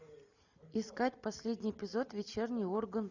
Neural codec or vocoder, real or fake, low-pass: none; real; 7.2 kHz